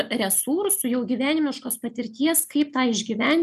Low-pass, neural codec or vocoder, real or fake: 14.4 kHz; none; real